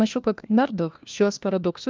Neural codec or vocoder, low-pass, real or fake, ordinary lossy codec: codec, 16 kHz, 1 kbps, FunCodec, trained on LibriTTS, 50 frames a second; 7.2 kHz; fake; Opus, 24 kbps